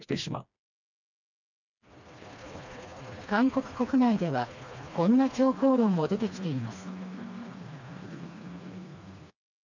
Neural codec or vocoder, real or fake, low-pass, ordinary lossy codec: codec, 16 kHz, 2 kbps, FreqCodec, smaller model; fake; 7.2 kHz; none